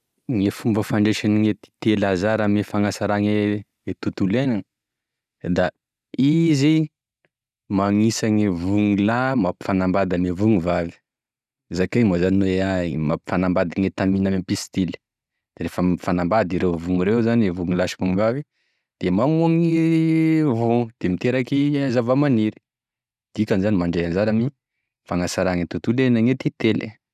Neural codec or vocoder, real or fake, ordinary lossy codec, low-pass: none; real; none; 14.4 kHz